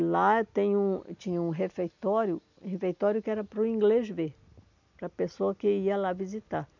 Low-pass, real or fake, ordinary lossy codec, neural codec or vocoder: 7.2 kHz; real; none; none